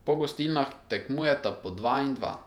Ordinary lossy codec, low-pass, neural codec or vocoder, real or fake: none; 19.8 kHz; vocoder, 48 kHz, 128 mel bands, Vocos; fake